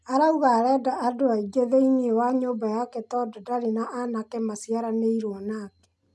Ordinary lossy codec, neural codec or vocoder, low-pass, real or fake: none; none; none; real